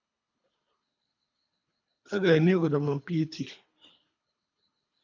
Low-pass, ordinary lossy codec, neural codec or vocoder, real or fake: 7.2 kHz; AAC, 48 kbps; codec, 24 kHz, 3 kbps, HILCodec; fake